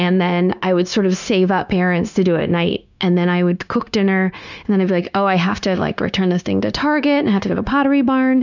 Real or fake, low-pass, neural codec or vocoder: fake; 7.2 kHz; codec, 16 kHz, 0.9 kbps, LongCat-Audio-Codec